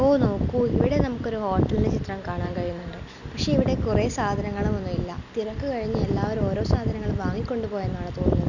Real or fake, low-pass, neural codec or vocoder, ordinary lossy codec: real; 7.2 kHz; none; none